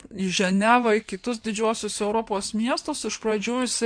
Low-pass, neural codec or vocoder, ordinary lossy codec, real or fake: 9.9 kHz; codec, 16 kHz in and 24 kHz out, 2.2 kbps, FireRedTTS-2 codec; MP3, 64 kbps; fake